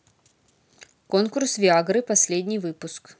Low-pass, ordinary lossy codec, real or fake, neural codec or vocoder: none; none; real; none